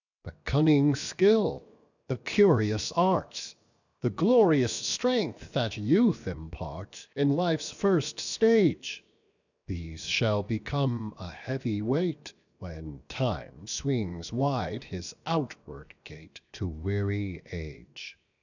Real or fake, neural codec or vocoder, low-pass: fake; codec, 16 kHz, 0.7 kbps, FocalCodec; 7.2 kHz